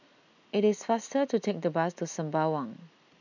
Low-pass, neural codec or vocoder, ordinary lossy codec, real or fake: 7.2 kHz; none; none; real